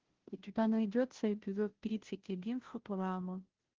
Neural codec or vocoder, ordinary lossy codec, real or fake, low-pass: codec, 16 kHz, 0.5 kbps, FunCodec, trained on Chinese and English, 25 frames a second; Opus, 16 kbps; fake; 7.2 kHz